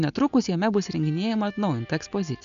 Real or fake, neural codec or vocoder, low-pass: real; none; 7.2 kHz